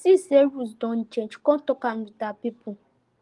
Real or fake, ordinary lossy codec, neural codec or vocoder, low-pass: real; Opus, 24 kbps; none; 10.8 kHz